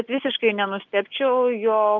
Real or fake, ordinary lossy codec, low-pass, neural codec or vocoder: real; Opus, 32 kbps; 7.2 kHz; none